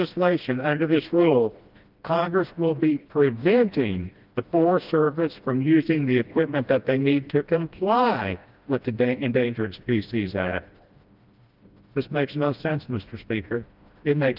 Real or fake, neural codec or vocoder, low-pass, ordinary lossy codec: fake; codec, 16 kHz, 1 kbps, FreqCodec, smaller model; 5.4 kHz; Opus, 24 kbps